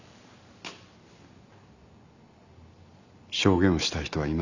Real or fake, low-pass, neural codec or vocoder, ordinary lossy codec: real; 7.2 kHz; none; none